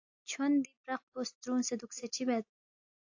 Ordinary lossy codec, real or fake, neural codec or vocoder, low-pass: AAC, 48 kbps; real; none; 7.2 kHz